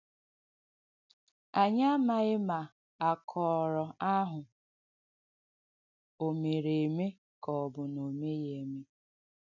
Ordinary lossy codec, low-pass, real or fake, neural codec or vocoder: none; 7.2 kHz; real; none